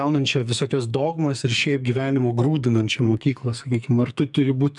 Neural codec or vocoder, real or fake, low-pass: codec, 44.1 kHz, 2.6 kbps, SNAC; fake; 10.8 kHz